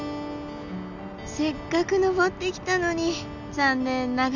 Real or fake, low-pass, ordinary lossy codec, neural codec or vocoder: real; 7.2 kHz; none; none